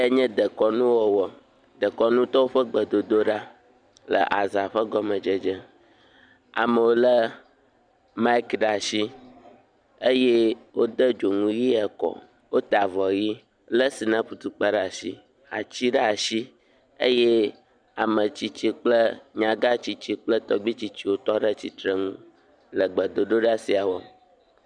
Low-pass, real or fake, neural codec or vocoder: 9.9 kHz; real; none